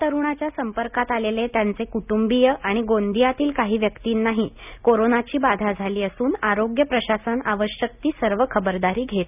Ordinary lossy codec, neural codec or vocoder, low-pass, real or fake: none; none; 3.6 kHz; real